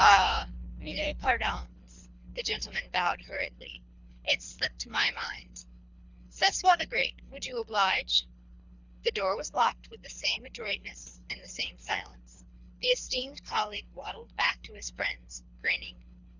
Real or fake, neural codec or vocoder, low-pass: fake; codec, 24 kHz, 3 kbps, HILCodec; 7.2 kHz